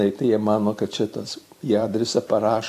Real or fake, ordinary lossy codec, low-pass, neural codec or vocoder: fake; AAC, 64 kbps; 14.4 kHz; vocoder, 44.1 kHz, 128 mel bands every 256 samples, BigVGAN v2